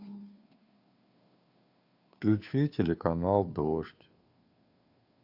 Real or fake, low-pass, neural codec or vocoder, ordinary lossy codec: fake; 5.4 kHz; codec, 16 kHz, 2 kbps, FunCodec, trained on Chinese and English, 25 frames a second; Opus, 64 kbps